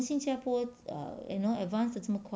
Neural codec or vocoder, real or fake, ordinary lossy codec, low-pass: none; real; none; none